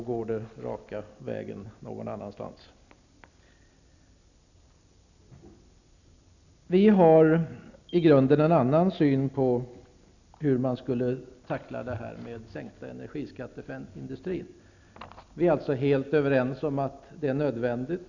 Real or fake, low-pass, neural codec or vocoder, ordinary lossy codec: real; 7.2 kHz; none; none